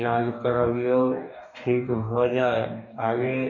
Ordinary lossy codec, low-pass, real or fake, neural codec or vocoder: none; 7.2 kHz; fake; codec, 44.1 kHz, 2.6 kbps, DAC